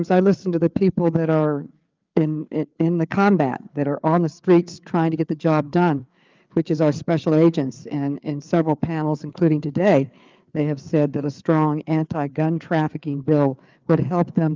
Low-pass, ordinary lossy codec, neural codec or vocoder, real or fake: 7.2 kHz; Opus, 24 kbps; codec, 16 kHz, 4 kbps, FreqCodec, larger model; fake